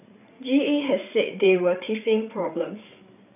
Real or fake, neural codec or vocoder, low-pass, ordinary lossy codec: fake; codec, 16 kHz, 8 kbps, FreqCodec, larger model; 3.6 kHz; none